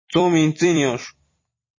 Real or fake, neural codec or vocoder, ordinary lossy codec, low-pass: fake; vocoder, 44.1 kHz, 128 mel bands every 256 samples, BigVGAN v2; MP3, 32 kbps; 7.2 kHz